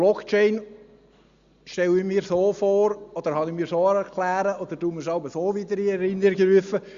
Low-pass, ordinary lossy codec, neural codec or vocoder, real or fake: 7.2 kHz; Opus, 64 kbps; none; real